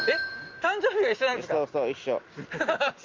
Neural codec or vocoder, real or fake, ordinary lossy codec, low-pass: none; real; Opus, 32 kbps; 7.2 kHz